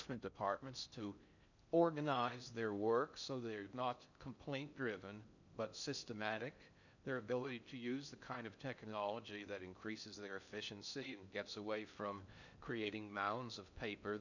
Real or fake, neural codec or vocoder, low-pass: fake; codec, 16 kHz in and 24 kHz out, 0.6 kbps, FocalCodec, streaming, 4096 codes; 7.2 kHz